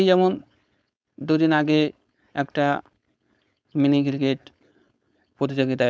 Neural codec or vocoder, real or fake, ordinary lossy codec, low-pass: codec, 16 kHz, 4.8 kbps, FACodec; fake; none; none